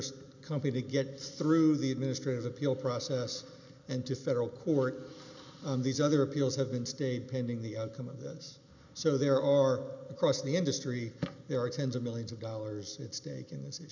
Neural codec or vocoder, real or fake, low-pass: none; real; 7.2 kHz